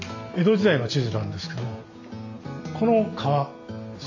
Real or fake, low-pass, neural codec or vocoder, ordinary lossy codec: real; 7.2 kHz; none; AAC, 48 kbps